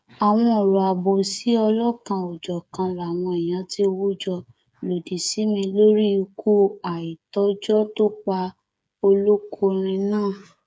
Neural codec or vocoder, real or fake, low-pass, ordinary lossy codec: codec, 16 kHz, 8 kbps, FreqCodec, smaller model; fake; none; none